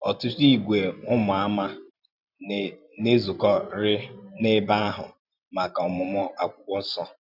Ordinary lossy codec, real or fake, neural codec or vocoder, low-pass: none; fake; vocoder, 44.1 kHz, 128 mel bands every 512 samples, BigVGAN v2; 5.4 kHz